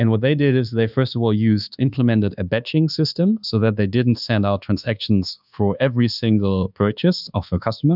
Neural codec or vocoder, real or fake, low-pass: codec, 24 kHz, 1.2 kbps, DualCodec; fake; 5.4 kHz